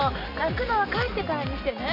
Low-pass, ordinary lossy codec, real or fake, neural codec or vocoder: 5.4 kHz; none; real; none